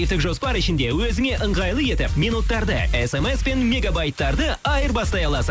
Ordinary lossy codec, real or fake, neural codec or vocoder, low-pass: none; real; none; none